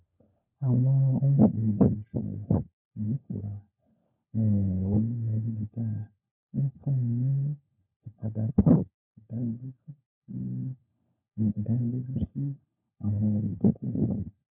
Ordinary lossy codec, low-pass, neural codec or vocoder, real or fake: none; 3.6 kHz; codec, 16 kHz, 4 kbps, FunCodec, trained on LibriTTS, 50 frames a second; fake